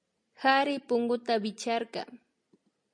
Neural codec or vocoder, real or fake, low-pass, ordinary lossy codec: none; real; 9.9 kHz; MP3, 48 kbps